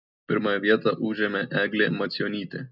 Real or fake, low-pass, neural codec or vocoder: real; 5.4 kHz; none